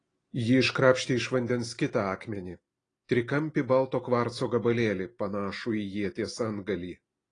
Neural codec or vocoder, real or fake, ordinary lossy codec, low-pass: none; real; AAC, 32 kbps; 9.9 kHz